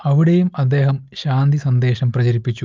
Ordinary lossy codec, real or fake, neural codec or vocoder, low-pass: Opus, 32 kbps; real; none; 7.2 kHz